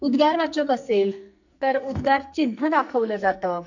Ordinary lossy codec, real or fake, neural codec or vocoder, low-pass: none; fake; codec, 44.1 kHz, 2.6 kbps, SNAC; 7.2 kHz